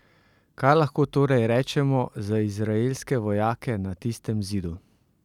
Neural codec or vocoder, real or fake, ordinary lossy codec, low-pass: none; real; none; 19.8 kHz